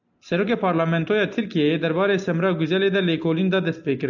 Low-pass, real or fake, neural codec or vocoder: 7.2 kHz; real; none